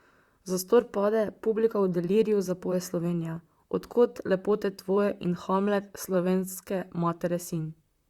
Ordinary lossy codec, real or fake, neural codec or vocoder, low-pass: Opus, 64 kbps; fake; vocoder, 44.1 kHz, 128 mel bands, Pupu-Vocoder; 19.8 kHz